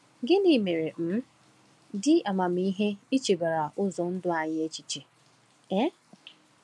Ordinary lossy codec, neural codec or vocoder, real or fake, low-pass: none; none; real; none